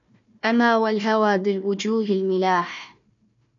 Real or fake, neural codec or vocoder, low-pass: fake; codec, 16 kHz, 1 kbps, FunCodec, trained on Chinese and English, 50 frames a second; 7.2 kHz